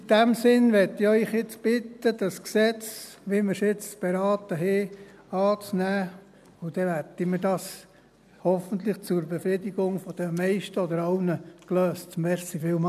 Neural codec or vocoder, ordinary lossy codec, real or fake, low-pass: none; none; real; 14.4 kHz